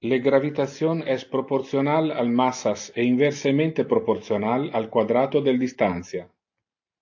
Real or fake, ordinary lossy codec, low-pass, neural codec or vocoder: real; AAC, 48 kbps; 7.2 kHz; none